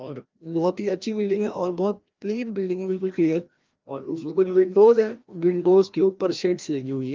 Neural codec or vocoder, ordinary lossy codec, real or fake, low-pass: codec, 16 kHz, 1 kbps, FreqCodec, larger model; Opus, 24 kbps; fake; 7.2 kHz